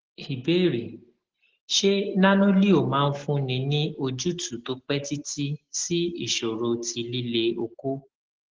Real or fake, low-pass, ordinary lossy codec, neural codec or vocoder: real; 7.2 kHz; Opus, 16 kbps; none